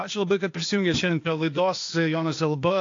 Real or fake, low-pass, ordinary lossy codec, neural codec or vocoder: fake; 7.2 kHz; AAC, 48 kbps; codec, 16 kHz, 0.8 kbps, ZipCodec